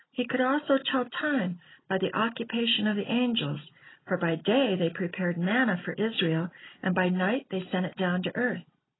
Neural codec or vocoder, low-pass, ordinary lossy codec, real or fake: none; 7.2 kHz; AAC, 16 kbps; real